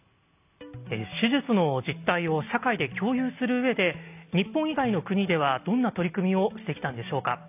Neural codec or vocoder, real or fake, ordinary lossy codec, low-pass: none; real; AAC, 32 kbps; 3.6 kHz